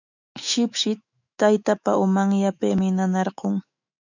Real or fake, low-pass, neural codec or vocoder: fake; 7.2 kHz; autoencoder, 48 kHz, 128 numbers a frame, DAC-VAE, trained on Japanese speech